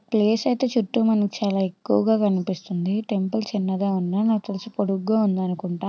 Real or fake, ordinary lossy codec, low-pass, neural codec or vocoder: real; none; none; none